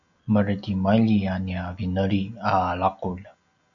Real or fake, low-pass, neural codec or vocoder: real; 7.2 kHz; none